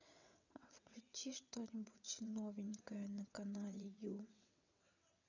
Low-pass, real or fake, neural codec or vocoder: 7.2 kHz; fake; vocoder, 22.05 kHz, 80 mel bands, WaveNeXt